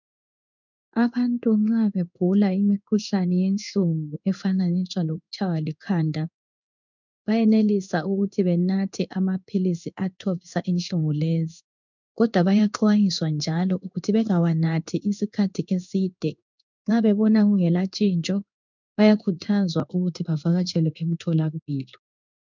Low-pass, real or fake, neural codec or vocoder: 7.2 kHz; fake; codec, 16 kHz in and 24 kHz out, 1 kbps, XY-Tokenizer